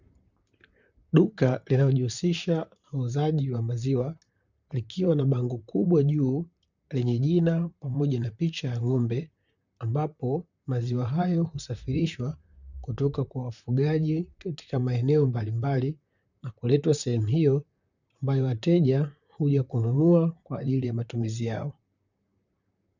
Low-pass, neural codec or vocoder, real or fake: 7.2 kHz; vocoder, 44.1 kHz, 128 mel bands, Pupu-Vocoder; fake